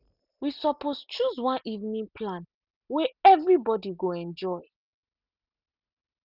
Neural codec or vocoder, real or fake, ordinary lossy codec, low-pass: none; real; none; 5.4 kHz